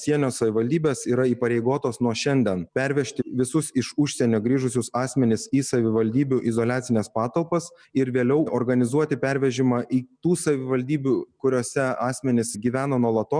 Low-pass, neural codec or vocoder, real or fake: 9.9 kHz; none; real